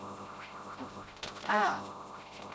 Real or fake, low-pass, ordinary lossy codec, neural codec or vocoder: fake; none; none; codec, 16 kHz, 0.5 kbps, FreqCodec, smaller model